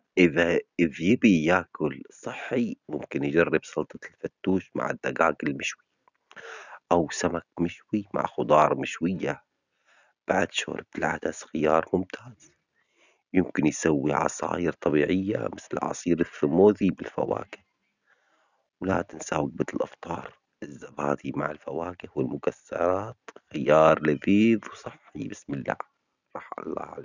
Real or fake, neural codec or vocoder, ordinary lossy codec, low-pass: real; none; none; 7.2 kHz